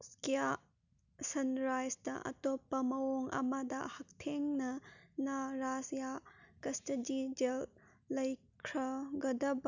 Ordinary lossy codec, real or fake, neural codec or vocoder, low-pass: none; real; none; 7.2 kHz